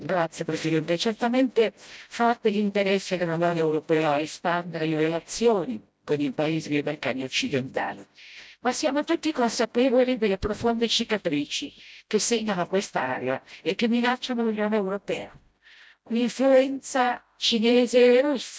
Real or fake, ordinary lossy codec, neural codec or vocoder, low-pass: fake; none; codec, 16 kHz, 0.5 kbps, FreqCodec, smaller model; none